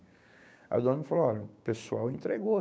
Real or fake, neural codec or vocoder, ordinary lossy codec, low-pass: fake; codec, 16 kHz, 6 kbps, DAC; none; none